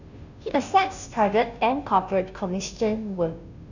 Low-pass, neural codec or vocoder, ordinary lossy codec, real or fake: 7.2 kHz; codec, 16 kHz, 0.5 kbps, FunCodec, trained on Chinese and English, 25 frames a second; none; fake